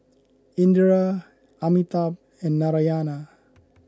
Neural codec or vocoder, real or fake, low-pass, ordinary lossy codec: none; real; none; none